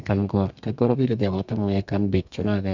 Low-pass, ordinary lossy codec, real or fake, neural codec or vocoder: 7.2 kHz; none; fake; codec, 32 kHz, 1.9 kbps, SNAC